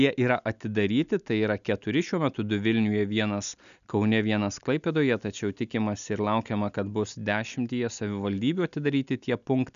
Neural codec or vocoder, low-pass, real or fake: none; 7.2 kHz; real